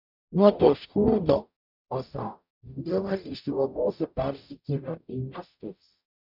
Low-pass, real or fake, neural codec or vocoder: 5.4 kHz; fake; codec, 44.1 kHz, 0.9 kbps, DAC